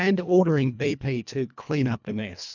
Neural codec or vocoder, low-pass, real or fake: codec, 24 kHz, 1.5 kbps, HILCodec; 7.2 kHz; fake